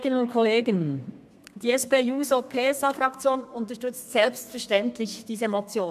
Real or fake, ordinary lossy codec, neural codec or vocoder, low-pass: fake; MP3, 96 kbps; codec, 32 kHz, 1.9 kbps, SNAC; 14.4 kHz